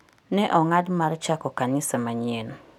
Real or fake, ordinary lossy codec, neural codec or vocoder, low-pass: real; none; none; 19.8 kHz